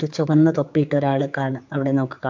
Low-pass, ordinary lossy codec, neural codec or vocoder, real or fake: 7.2 kHz; MP3, 64 kbps; codec, 16 kHz, 4 kbps, X-Codec, HuBERT features, trained on general audio; fake